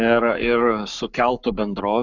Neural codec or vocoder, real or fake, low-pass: codec, 44.1 kHz, 7.8 kbps, Pupu-Codec; fake; 7.2 kHz